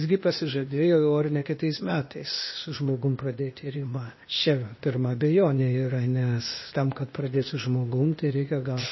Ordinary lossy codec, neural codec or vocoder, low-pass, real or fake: MP3, 24 kbps; codec, 16 kHz, 0.8 kbps, ZipCodec; 7.2 kHz; fake